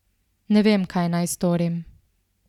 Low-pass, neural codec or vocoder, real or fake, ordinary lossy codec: 19.8 kHz; none; real; none